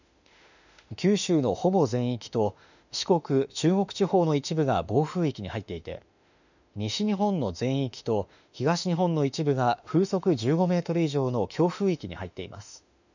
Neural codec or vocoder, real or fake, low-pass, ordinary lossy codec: autoencoder, 48 kHz, 32 numbers a frame, DAC-VAE, trained on Japanese speech; fake; 7.2 kHz; none